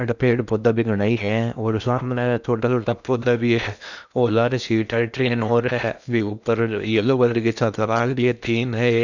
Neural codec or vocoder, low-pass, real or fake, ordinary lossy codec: codec, 16 kHz in and 24 kHz out, 0.8 kbps, FocalCodec, streaming, 65536 codes; 7.2 kHz; fake; none